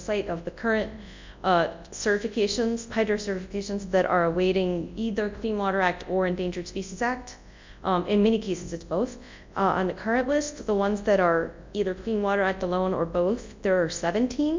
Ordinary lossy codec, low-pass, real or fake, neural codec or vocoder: MP3, 64 kbps; 7.2 kHz; fake; codec, 24 kHz, 0.9 kbps, WavTokenizer, large speech release